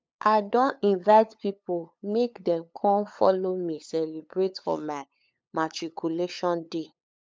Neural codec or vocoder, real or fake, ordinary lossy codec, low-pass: codec, 16 kHz, 8 kbps, FunCodec, trained on LibriTTS, 25 frames a second; fake; none; none